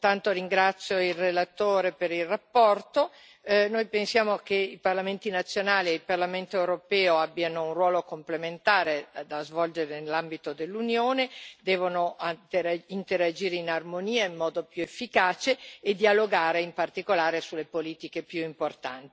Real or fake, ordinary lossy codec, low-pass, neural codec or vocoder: real; none; none; none